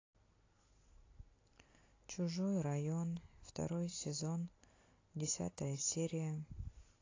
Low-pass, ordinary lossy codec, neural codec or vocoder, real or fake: 7.2 kHz; AAC, 32 kbps; none; real